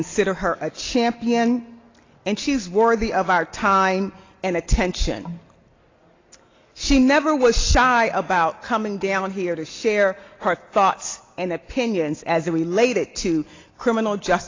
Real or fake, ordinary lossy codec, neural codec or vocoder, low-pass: real; AAC, 32 kbps; none; 7.2 kHz